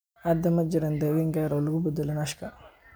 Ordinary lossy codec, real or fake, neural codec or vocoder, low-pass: none; real; none; none